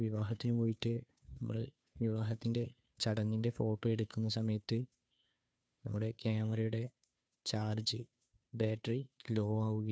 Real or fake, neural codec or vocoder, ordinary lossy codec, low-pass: fake; codec, 16 kHz, 2 kbps, FunCodec, trained on Chinese and English, 25 frames a second; none; none